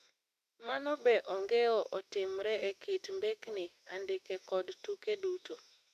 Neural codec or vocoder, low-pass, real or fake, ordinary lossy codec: autoencoder, 48 kHz, 32 numbers a frame, DAC-VAE, trained on Japanese speech; 14.4 kHz; fake; none